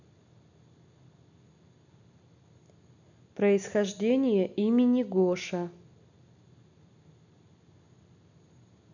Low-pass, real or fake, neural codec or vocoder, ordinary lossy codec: 7.2 kHz; real; none; none